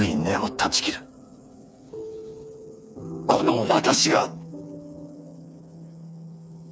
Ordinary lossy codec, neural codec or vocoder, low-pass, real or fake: none; codec, 16 kHz, 4 kbps, FreqCodec, smaller model; none; fake